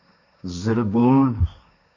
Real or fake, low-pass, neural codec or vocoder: fake; 7.2 kHz; codec, 16 kHz, 1.1 kbps, Voila-Tokenizer